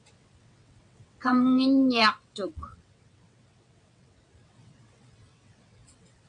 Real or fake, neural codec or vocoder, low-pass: fake; vocoder, 22.05 kHz, 80 mel bands, WaveNeXt; 9.9 kHz